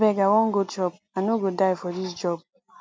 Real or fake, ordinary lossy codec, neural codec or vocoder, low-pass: real; none; none; none